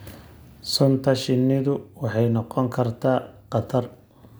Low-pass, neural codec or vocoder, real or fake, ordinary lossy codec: none; none; real; none